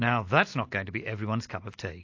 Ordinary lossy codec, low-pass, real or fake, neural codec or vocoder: AAC, 48 kbps; 7.2 kHz; real; none